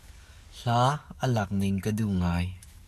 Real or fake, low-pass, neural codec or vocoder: fake; 14.4 kHz; codec, 44.1 kHz, 7.8 kbps, DAC